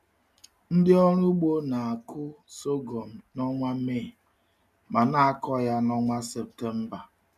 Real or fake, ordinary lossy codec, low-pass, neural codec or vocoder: real; MP3, 96 kbps; 14.4 kHz; none